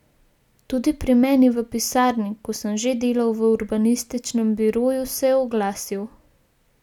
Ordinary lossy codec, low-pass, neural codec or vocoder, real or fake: none; 19.8 kHz; none; real